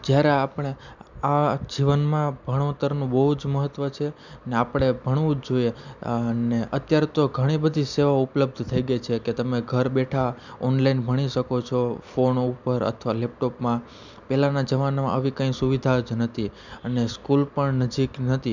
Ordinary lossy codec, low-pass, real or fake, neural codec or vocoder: none; 7.2 kHz; real; none